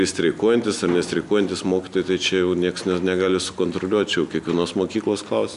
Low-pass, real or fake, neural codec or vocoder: 10.8 kHz; real; none